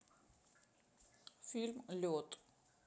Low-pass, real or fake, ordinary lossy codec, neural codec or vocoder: none; real; none; none